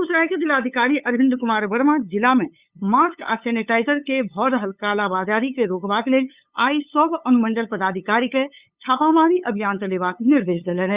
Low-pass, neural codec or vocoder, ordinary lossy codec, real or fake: 3.6 kHz; codec, 16 kHz, 8 kbps, FunCodec, trained on LibriTTS, 25 frames a second; Opus, 64 kbps; fake